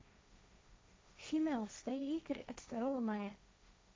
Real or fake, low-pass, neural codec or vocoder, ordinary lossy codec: fake; none; codec, 16 kHz, 1.1 kbps, Voila-Tokenizer; none